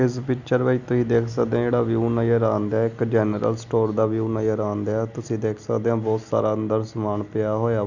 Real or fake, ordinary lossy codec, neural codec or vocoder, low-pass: real; none; none; 7.2 kHz